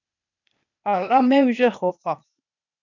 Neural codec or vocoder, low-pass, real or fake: codec, 16 kHz, 0.8 kbps, ZipCodec; 7.2 kHz; fake